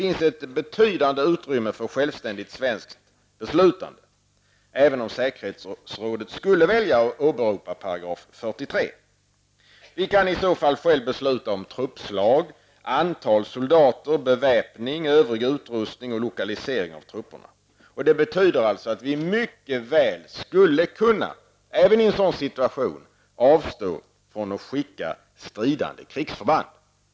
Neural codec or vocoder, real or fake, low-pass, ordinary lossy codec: none; real; none; none